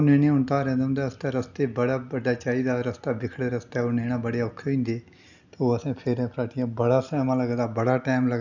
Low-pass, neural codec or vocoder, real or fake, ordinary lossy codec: 7.2 kHz; none; real; none